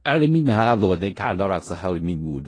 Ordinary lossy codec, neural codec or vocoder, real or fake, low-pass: AAC, 32 kbps; codec, 16 kHz in and 24 kHz out, 0.4 kbps, LongCat-Audio-Codec, four codebook decoder; fake; 9.9 kHz